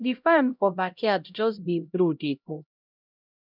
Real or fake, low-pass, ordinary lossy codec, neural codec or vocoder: fake; 5.4 kHz; none; codec, 16 kHz, 0.5 kbps, X-Codec, HuBERT features, trained on LibriSpeech